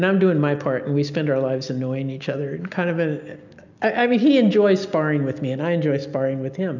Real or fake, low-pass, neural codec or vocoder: real; 7.2 kHz; none